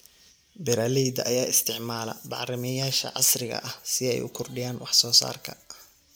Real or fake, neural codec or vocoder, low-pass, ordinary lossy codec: real; none; none; none